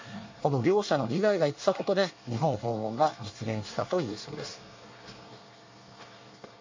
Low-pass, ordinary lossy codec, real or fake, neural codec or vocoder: 7.2 kHz; MP3, 48 kbps; fake; codec, 24 kHz, 1 kbps, SNAC